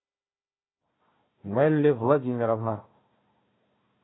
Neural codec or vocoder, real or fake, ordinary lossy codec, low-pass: codec, 16 kHz, 1 kbps, FunCodec, trained on Chinese and English, 50 frames a second; fake; AAC, 16 kbps; 7.2 kHz